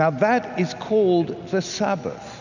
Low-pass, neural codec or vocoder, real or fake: 7.2 kHz; none; real